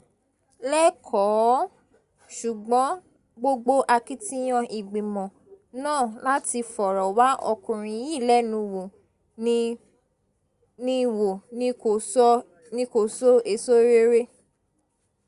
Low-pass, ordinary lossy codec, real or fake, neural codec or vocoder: 10.8 kHz; Opus, 64 kbps; real; none